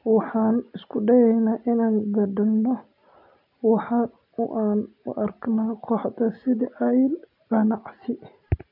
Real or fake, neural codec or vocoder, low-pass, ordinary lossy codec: real; none; 5.4 kHz; none